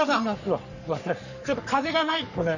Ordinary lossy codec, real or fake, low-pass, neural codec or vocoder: none; fake; 7.2 kHz; codec, 44.1 kHz, 3.4 kbps, Pupu-Codec